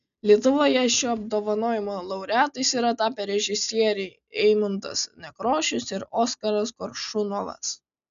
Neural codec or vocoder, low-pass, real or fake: none; 7.2 kHz; real